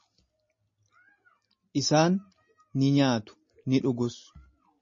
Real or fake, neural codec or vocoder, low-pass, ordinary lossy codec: real; none; 7.2 kHz; MP3, 32 kbps